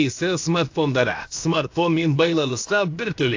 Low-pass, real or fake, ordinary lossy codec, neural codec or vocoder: 7.2 kHz; fake; AAC, 48 kbps; codec, 16 kHz, about 1 kbps, DyCAST, with the encoder's durations